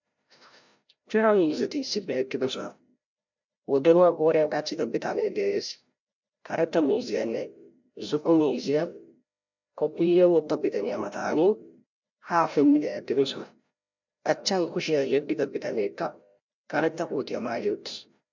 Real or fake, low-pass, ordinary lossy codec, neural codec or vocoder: fake; 7.2 kHz; MP3, 64 kbps; codec, 16 kHz, 0.5 kbps, FreqCodec, larger model